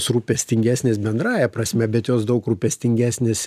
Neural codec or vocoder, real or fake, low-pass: none; real; 14.4 kHz